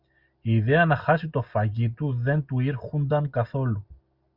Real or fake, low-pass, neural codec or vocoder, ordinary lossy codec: real; 5.4 kHz; none; Opus, 64 kbps